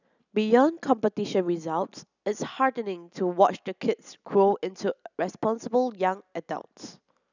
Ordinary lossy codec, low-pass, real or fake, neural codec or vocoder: none; 7.2 kHz; real; none